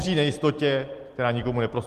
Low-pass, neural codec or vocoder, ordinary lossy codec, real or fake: 14.4 kHz; vocoder, 44.1 kHz, 128 mel bands every 256 samples, BigVGAN v2; Opus, 24 kbps; fake